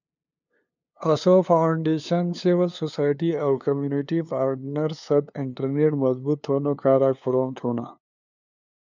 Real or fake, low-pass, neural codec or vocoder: fake; 7.2 kHz; codec, 16 kHz, 2 kbps, FunCodec, trained on LibriTTS, 25 frames a second